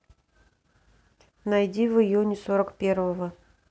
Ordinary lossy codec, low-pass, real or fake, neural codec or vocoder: none; none; real; none